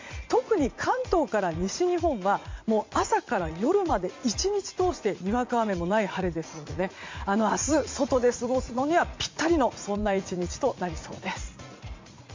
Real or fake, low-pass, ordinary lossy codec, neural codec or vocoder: fake; 7.2 kHz; MP3, 48 kbps; vocoder, 44.1 kHz, 80 mel bands, Vocos